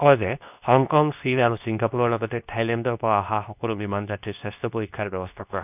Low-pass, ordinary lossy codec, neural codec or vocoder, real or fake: 3.6 kHz; none; codec, 24 kHz, 0.9 kbps, WavTokenizer, medium speech release version 2; fake